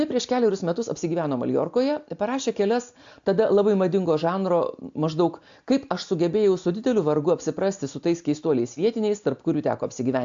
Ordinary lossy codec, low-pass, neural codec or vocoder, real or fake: AAC, 64 kbps; 7.2 kHz; none; real